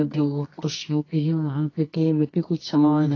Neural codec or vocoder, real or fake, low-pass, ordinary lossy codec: codec, 24 kHz, 0.9 kbps, WavTokenizer, medium music audio release; fake; 7.2 kHz; AAC, 32 kbps